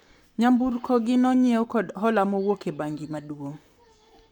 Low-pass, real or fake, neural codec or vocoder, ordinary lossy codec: 19.8 kHz; fake; codec, 44.1 kHz, 7.8 kbps, Pupu-Codec; none